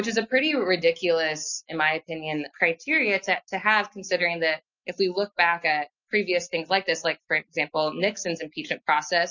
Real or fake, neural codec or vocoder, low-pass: real; none; 7.2 kHz